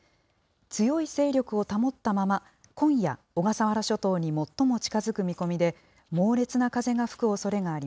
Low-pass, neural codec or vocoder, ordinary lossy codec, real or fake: none; none; none; real